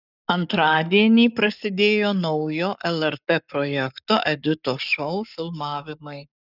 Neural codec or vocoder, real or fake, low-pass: codec, 44.1 kHz, 7.8 kbps, Pupu-Codec; fake; 5.4 kHz